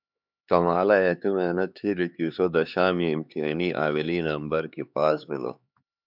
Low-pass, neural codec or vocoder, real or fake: 5.4 kHz; codec, 16 kHz, 4 kbps, X-Codec, HuBERT features, trained on LibriSpeech; fake